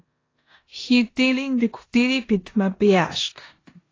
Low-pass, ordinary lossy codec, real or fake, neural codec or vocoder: 7.2 kHz; AAC, 32 kbps; fake; codec, 16 kHz in and 24 kHz out, 0.9 kbps, LongCat-Audio-Codec, four codebook decoder